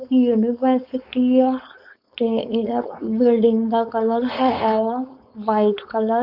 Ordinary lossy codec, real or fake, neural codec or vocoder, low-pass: none; fake; codec, 16 kHz, 4.8 kbps, FACodec; 5.4 kHz